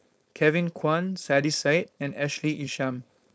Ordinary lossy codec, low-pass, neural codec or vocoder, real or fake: none; none; codec, 16 kHz, 4.8 kbps, FACodec; fake